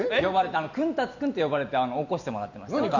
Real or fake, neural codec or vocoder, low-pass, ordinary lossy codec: real; none; 7.2 kHz; none